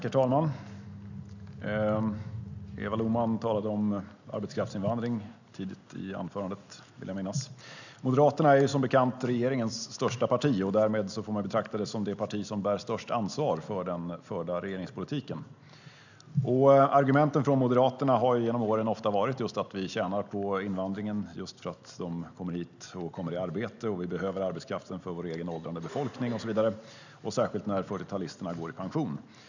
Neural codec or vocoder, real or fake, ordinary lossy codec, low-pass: none; real; none; 7.2 kHz